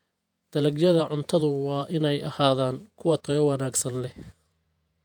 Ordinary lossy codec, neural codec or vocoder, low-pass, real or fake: none; vocoder, 48 kHz, 128 mel bands, Vocos; 19.8 kHz; fake